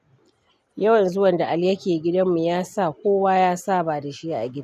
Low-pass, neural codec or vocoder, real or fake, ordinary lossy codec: 14.4 kHz; none; real; none